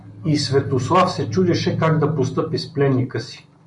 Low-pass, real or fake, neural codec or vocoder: 10.8 kHz; real; none